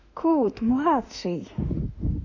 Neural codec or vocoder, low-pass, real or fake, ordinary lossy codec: autoencoder, 48 kHz, 32 numbers a frame, DAC-VAE, trained on Japanese speech; 7.2 kHz; fake; none